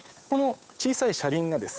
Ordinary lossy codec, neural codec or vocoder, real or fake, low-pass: none; codec, 16 kHz, 8 kbps, FunCodec, trained on Chinese and English, 25 frames a second; fake; none